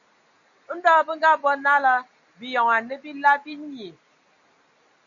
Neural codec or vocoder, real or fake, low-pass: none; real; 7.2 kHz